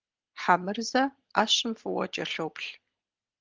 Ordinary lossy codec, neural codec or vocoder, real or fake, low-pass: Opus, 16 kbps; none; real; 7.2 kHz